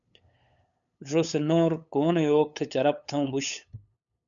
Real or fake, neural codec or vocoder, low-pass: fake; codec, 16 kHz, 8 kbps, FunCodec, trained on LibriTTS, 25 frames a second; 7.2 kHz